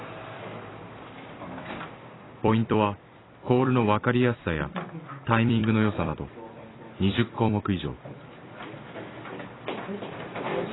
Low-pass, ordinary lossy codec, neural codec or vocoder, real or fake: 7.2 kHz; AAC, 16 kbps; vocoder, 44.1 kHz, 128 mel bands every 256 samples, BigVGAN v2; fake